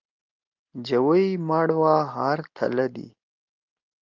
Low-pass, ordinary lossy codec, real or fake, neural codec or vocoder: 7.2 kHz; Opus, 32 kbps; real; none